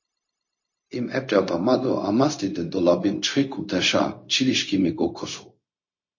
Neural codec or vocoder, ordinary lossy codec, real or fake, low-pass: codec, 16 kHz, 0.4 kbps, LongCat-Audio-Codec; MP3, 32 kbps; fake; 7.2 kHz